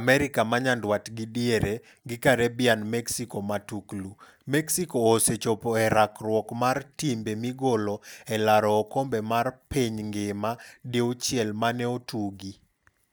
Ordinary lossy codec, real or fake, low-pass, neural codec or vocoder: none; real; none; none